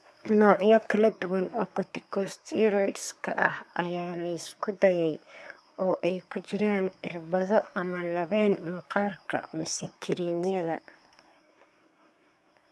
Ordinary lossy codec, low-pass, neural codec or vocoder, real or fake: none; none; codec, 24 kHz, 1 kbps, SNAC; fake